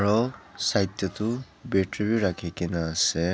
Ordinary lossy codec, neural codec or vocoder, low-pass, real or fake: none; none; none; real